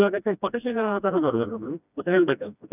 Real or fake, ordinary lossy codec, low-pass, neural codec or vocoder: fake; none; 3.6 kHz; codec, 16 kHz, 1 kbps, FreqCodec, smaller model